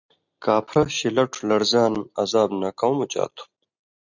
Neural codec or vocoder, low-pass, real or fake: none; 7.2 kHz; real